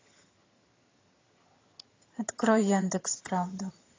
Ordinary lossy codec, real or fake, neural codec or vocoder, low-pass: AAC, 32 kbps; fake; vocoder, 22.05 kHz, 80 mel bands, HiFi-GAN; 7.2 kHz